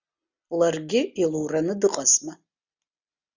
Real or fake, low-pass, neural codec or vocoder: real; 7.2 kHz; none